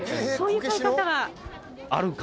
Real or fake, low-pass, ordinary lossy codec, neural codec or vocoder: real; none; none; none